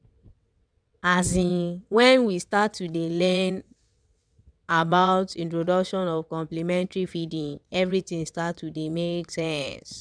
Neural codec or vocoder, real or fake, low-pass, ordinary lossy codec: vocoder, 22.05 kHz, 80 mel bands, WaveNeXt; fake; 9.9 kHz; none